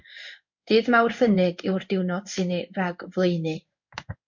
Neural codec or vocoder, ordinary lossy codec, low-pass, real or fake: codec, 16 kHz in and 24 kHz out, 1 kbps, XY-Tokenizer; MP3, 48 kbps; 7.2 kHz; fake